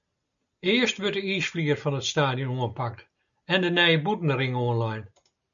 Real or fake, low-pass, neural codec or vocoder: real; 7.2 kHz; none